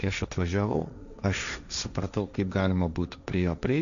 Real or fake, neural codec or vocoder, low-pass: fake; codec, 16 kHz, 1.1 kbps, Voila-Tokenizer; 7.2 kHz